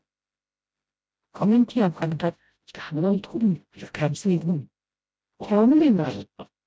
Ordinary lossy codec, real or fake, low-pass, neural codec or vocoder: none; fake; none; codec, 16 kHz, 0.5 kbps, FreqCodec, smaller model